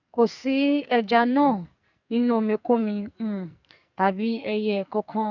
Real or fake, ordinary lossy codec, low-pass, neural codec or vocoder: fake; none; 7.2 kHz; codec, 44.1 kHz, 2.6 kbps, SNAC